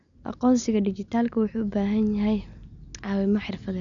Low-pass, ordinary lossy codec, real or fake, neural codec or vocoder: 7.2 kHz; none; real; none